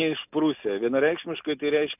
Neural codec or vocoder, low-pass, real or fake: none; 3.6 kHz; real